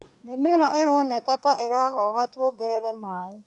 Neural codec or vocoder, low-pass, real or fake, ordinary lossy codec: codec, 24 kHz, 1 kbps, SNAC; 10.8 kHz; fake; none